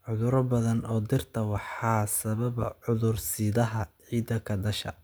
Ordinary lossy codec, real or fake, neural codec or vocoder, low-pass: none; real; none; none